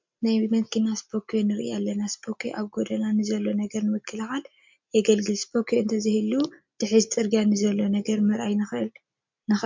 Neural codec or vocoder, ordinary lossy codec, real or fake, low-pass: none; MP3, 64 kbps; real; 7.2 kHz